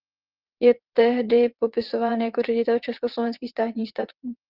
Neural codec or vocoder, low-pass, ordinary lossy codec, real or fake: vocoder, 22.05 kHz, 80 mel bands, WaveNeXt; 5.4 kHz; Opus, 32 kbps; fake